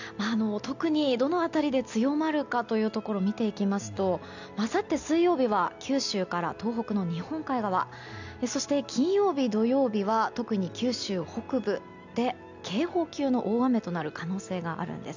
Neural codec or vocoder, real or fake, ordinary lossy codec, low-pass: none; real; none; 7.2 kHz